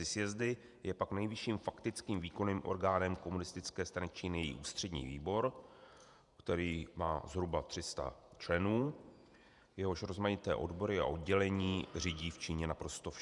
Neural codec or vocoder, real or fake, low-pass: vocoder, 48 kHz, 128 mel bands, Vocos; fake; 10.8 kHz